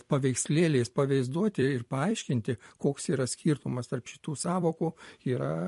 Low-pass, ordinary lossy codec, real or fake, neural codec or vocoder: 14.4 kHz; MP3, 48 kbps; fake; vocoder, 44.1 kHz, 128 mel bands every 256 samples, BigVGAN v2